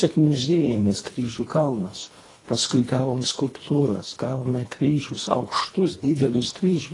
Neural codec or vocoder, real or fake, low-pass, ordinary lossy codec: codec, 24 kHz, 1.5 kbps, HILCodec; fake; 10.8 kHz; AAC, 32 kbps